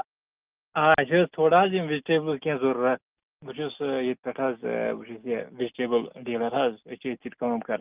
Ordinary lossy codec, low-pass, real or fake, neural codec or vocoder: Opus, 24 kbps; 3.6 kHz; real; none